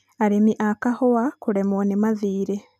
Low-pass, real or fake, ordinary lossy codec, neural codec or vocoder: 14.4 kHz; real; none; none